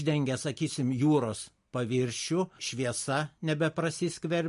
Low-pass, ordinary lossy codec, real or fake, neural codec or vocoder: 14.4 kHz; MP3, 48 kbps; real; none